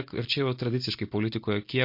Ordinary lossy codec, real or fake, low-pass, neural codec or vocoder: MP3, 32 kbps; real; 5.4 kHz; none